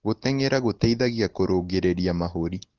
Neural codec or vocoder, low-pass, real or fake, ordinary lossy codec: none; 7.2 kHz; real; Opus, 16 kbps